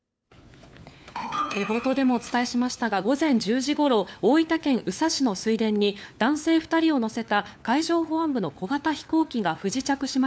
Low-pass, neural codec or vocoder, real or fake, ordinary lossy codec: none; codec, 16 kHz, 4 kbps, FunCodec, trained on LibriTTS, 50 frames a second; fake; none